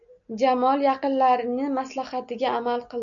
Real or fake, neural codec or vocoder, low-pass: real; none; 7.2 kHz